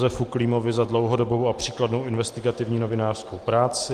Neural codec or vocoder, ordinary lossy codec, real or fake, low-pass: none; Opus, 24 kbps; real; 14.4 kHz